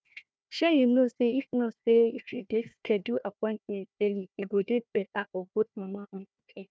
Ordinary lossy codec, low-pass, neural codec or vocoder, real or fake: none; none; codec, 16 kHz, 1 kbps, FunCodec, trained on Chinese and English, 50 frames a second; fake